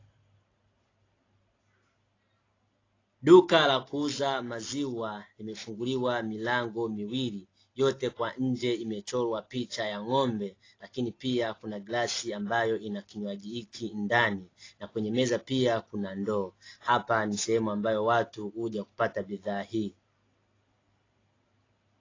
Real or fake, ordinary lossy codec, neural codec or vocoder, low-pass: real; AAC, 32 kbps; none; 7.2 kHz